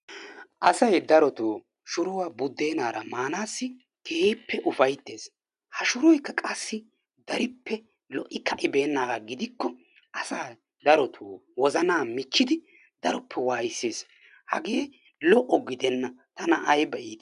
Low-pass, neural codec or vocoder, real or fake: 10.8 kHz; none; real